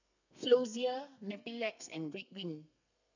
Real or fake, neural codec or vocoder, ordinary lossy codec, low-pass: fake; codec, 32 kHz, 1.9 kbps, SNAC; none; 7.2 kHz